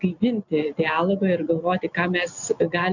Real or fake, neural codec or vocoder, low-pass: real; none; 7.2 kHz